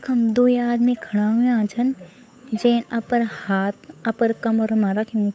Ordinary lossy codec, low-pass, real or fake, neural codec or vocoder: none; none; fake; codec, 16 kHz, 8 kbps, FunCodec, trained on Chinese and English, 25 frames a second